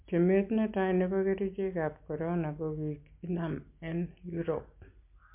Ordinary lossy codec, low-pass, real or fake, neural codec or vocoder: MP3, 32 kbps; 3.6 kHz; real; none